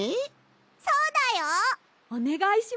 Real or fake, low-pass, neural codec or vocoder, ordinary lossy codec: real; none; none; none